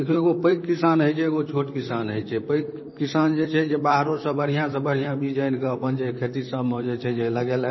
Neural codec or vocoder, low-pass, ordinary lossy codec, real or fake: vocoder, 44.1 kHz, 128 mel bands, Pupu-Vocoder; 7.2 kHz; MP3, 24 kbps; fake